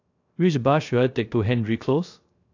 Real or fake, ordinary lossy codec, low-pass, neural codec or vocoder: fake; AAC, 48 kbps; 7.2 kHz; codec, 16 kHz, 0.3 kbps, FocalCodec